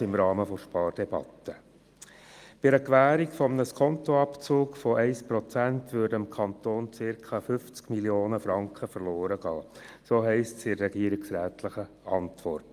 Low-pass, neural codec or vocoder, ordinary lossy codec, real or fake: 14.4 kHz; none; Opus, 32 kbps; real